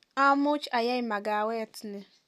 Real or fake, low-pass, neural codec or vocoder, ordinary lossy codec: real; 14.4 kHz; none; none